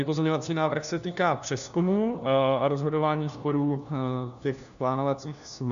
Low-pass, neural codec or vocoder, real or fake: 7.2 kHz; codec, 16 kHz, 1 kbps, FunCodec, trained on LibriTTS, 50 frames a second; fake